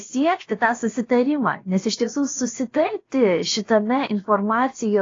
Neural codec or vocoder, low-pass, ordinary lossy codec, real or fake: codec, 16 kHz, about 1 kbps, DyCAST, with the encoder's durations; 7.2 kHz; AAC, 32 kbps; fake